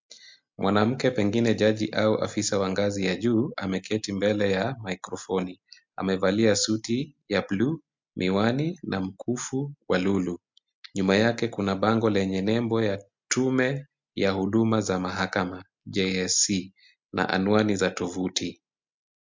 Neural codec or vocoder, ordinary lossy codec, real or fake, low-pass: none; MP3, 64 kbps; real; 7.2 kHz